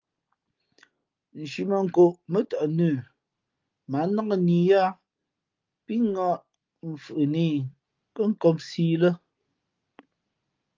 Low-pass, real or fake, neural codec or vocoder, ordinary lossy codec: 7.2 kHz; real; none; Opus, 24 kbps